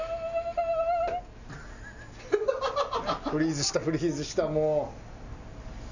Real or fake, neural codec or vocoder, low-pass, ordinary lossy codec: real; none; 7.2 kHz; none